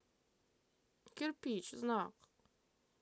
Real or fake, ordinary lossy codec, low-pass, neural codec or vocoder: real; none; none; none